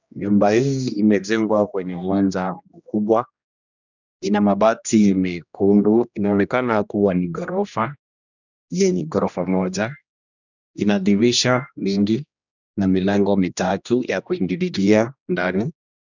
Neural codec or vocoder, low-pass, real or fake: codec, 16 kHz, 1 kbps, X-Codec, HuBERT features, trained on general audio; 7.2 kHz; fake